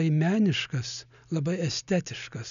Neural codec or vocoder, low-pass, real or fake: none; 7.2 kHz; real